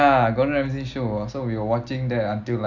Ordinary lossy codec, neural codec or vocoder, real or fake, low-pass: none; none; real; 7.2 kHz